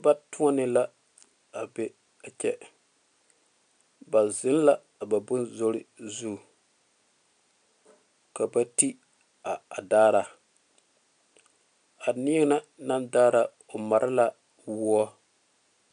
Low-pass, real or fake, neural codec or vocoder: 9.9 kHz; real; none